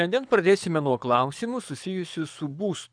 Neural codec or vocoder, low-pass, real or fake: codec, 24 kHz, 6 kbps, HILCodec; 9.9 kHz; fake